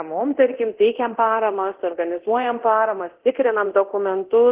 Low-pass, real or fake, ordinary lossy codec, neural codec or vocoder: 3.6 kHz; fake; Opus, 16 kbps; codec, 24 kHz, 0.9 kbps, DualCodec